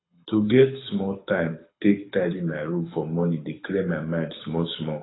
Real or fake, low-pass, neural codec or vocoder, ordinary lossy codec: fake; 7.2 kHz; codec, 24 kHz, 6 kbps, HILCodec; AAC, 16 kbps